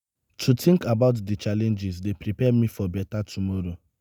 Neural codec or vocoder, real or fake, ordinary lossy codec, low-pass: none; real; none; none